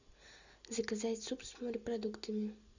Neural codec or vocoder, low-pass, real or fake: none; 7.2 kHz; real